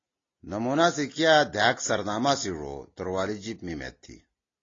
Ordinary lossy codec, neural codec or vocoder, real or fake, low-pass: AAC, 32 kbps; none; real; 7.2 kHz